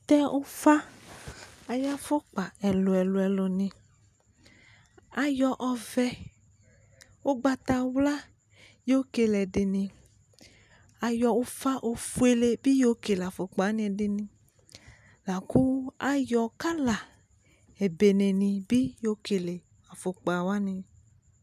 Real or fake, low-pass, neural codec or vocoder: real; 14.4 kHz; none